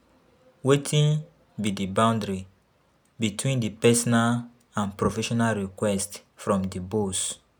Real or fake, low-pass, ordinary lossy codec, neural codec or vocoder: real; none; none; none